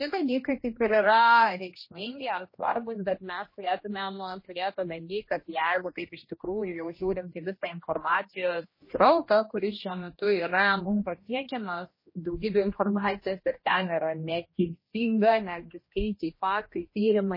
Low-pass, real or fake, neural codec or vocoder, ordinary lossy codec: 5.4 kHz; fake; codec, 16 kHz, 1 kbps, X-Codec, HuBERT features, trained on general audio; MP3, 24 kbps